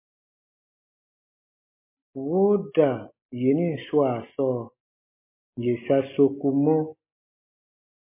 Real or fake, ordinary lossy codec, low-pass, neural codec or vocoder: real; MP3, 24 kbps; 3.6 kHz; none